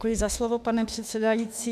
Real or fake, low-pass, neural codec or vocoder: fake; 14.4 kHz; autoencoder, 48 kHz, 32 numbers a frame, DAC-VAE, trained on Japanese speech